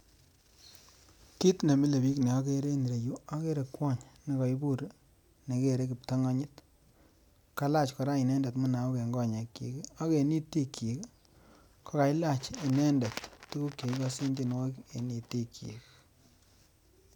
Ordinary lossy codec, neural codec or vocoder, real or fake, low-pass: none; none; real; 19.8 kHz